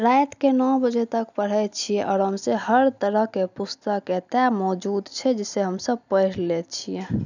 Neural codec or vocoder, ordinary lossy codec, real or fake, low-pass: none; none; real; 7.2 kHz